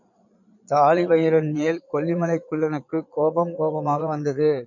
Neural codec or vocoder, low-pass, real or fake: vocoder, 22.05 kHz, 80 mel bands, Vocos; 7.2 kHz; fake